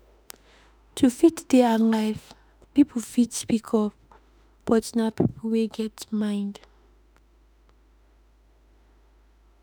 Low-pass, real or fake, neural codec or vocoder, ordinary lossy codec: none; fake; autoencoder, 48 kHz, 32 numbers a frame, DAC-VAE, trained on Japanese speech; none